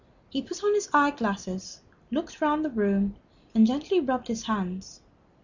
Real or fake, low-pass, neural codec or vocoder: real; 7.2 kHz; none